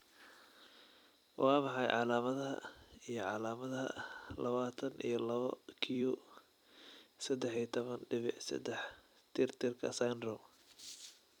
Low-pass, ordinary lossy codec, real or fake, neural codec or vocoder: 19.8 kHz; none; fake; vocoder, 44.1 kHz, 128 mel bands every 256 samples, BigVGAN v2